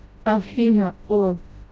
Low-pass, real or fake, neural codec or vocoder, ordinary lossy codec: none; fake; codec, 16 kHz, 0.5 kbps, FreqCodec, smaller model; none